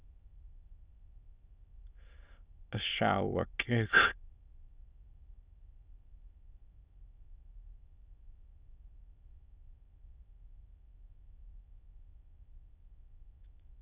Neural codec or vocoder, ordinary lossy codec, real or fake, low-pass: autoencoder, 22.05 kHz, a latent of 192 numbers a frame, VITS, trained on many speakers; Opus, 64 kbps; fake; 3.6 kHz